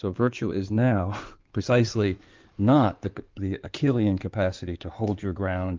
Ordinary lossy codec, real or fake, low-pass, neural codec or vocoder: Opus, 32 kbps; fake; 7.2 kHz; codec, 16 kHz in and 24 kHz out, 2.2 kbps, FireRedTTS-2 codec